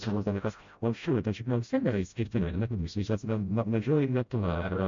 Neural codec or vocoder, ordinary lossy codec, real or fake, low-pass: codec, 16 kHz, 0.5 kbps, FreqCodec, smaller model; MP3, 48 kbps; fake; 7.2 kHz